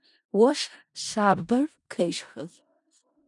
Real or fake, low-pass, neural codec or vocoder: fake; 10.8 kHz; codec, 16 kHz in and 24 kHz out, 0.4 kbps, LongCat-Audio-Codec, four codebook decoder